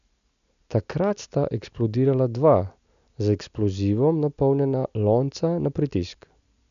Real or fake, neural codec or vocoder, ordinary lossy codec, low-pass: real; none; none; 7.2 kHz